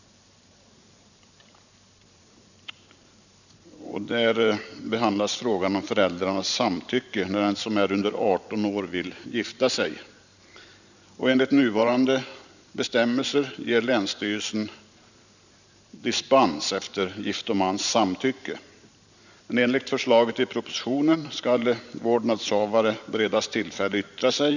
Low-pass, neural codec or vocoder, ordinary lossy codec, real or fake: 7.2 kHz; vocoder, 44.1 kHz, 128 mel bands every 512 samples, BigVGAN v2; none; fake